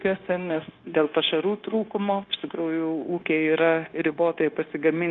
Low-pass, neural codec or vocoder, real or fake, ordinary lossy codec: 7.2 kHz; codec, 16 kHz, 0.9 kbps, LongCat-Audio-Codec; fake; Opus, 32 kbps